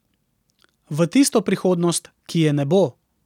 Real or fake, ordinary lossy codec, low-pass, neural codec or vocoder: real; none; 19.8 kHz; none